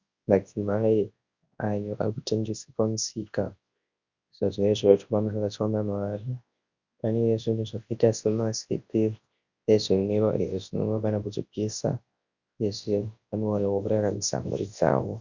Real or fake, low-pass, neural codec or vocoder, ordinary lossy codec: fake; 7.2 kHz; codec, 24 kHz, 0.9 kbps, WavTokenizer, large speech release; Opus, 64 kbps